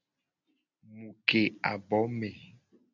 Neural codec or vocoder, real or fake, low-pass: none; real; 7.2 kHz